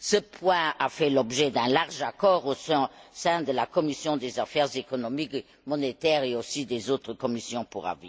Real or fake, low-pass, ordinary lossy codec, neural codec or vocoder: real; none; none; none